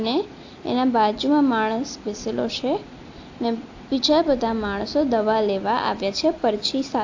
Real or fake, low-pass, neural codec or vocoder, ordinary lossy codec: real; 7.2 kHz; none; AAC, 48 kbps